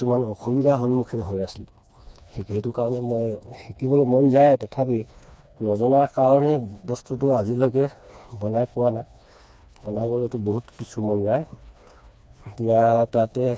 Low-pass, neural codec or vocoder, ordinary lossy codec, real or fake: none; codec, 16 kHz, 2 kbps, FreqCodec, smaller model; none; fake